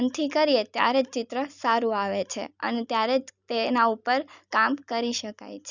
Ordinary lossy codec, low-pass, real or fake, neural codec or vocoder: none; 7.2 kHz; real; none